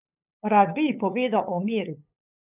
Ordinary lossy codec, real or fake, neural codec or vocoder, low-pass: none; fake; codec, 16 kHz, 8 kbps, FunCodec, trained on LibriTTS, 25 frames a second; 3.6 kHz